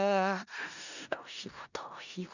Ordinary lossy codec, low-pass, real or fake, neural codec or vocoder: none; 7.2 kHz; fake; codec, 16 kHz in and 24 kHz out, 0.4 kbps, LongCat-Audio-Codec, four codebook decoder